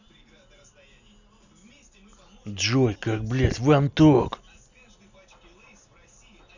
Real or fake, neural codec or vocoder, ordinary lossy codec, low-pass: real; none; none; 7.2 kHz